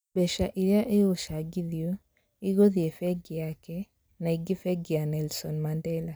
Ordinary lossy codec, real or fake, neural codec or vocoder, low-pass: none; real; none; none